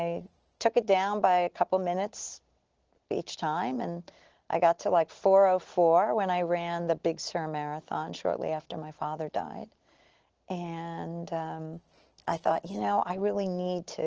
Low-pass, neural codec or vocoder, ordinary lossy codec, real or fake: 7.2 kHz; none; Opus, 16 kbps; real